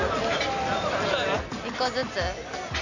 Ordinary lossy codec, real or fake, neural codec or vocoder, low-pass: none; real; none; 7.2 kHz